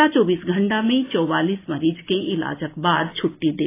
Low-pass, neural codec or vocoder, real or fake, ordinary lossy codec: 3.6 kHz; none; real; AAC, 24 kbps